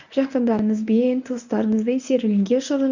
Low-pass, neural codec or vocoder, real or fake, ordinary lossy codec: 7.2 kHz; codec, 24 kHz, 0.9 kbps, WavTokenizer, medium speech release version 1; fake; none